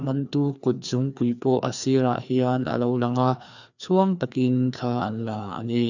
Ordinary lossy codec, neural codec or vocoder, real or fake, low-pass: none; codec, 16 kHz, 2 kbps, FreqCodec, larger model; fake; 7.2 kHz